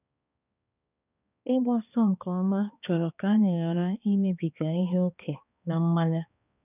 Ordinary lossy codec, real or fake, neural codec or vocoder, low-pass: none; fake; codec, 16 kHz, 2 kbps, X-Codec, HuBERT features, trained on balanced general audio; 3.6 kHz